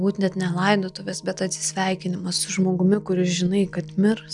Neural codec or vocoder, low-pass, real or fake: none; 10.8 kHz; real